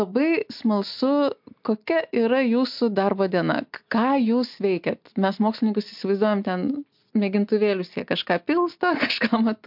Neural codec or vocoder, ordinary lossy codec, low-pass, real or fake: none; MP3, 48 kbps; 5.4 kHz; real